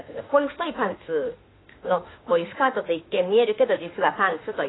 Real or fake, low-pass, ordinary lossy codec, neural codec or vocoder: fake; 7.2 kHz; AAC, 16 kbps; autoencoder, 48 kHz, 32 numbers a frame, DAC-VAE, trained on Japanese speech